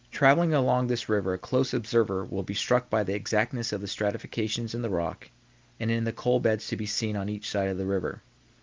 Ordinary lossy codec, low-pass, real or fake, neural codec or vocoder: Opus, 32 kbps; 7.2 kHz; real; none